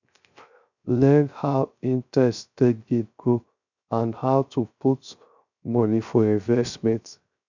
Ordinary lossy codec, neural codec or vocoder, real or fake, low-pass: none; codec, 16 kHz, 0.3 kbps, FocalCodec; fake; 7.2 kHz